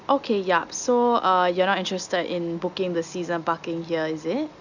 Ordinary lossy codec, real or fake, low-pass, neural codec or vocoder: none; real; 7.2 kHz; none